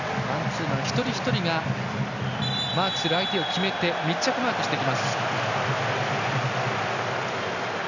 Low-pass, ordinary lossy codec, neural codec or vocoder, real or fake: 7.2 kHz; none; none; real